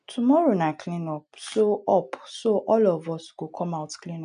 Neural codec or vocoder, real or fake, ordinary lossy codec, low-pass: none; real; none; 9.9 kHz